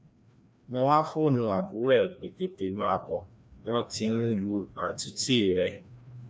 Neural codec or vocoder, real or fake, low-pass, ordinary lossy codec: codec, 16 kHz, 1 kbps, FreqCodec, larger model; fake; none; none